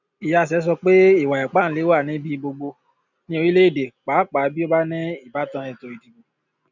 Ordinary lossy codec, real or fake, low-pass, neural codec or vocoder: none; real; 7.2 kHz; none